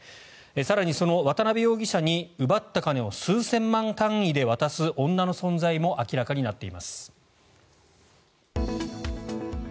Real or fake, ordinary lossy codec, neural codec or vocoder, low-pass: real; none; none; none